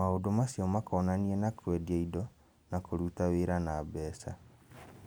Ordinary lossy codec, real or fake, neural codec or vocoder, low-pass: none; real; none; none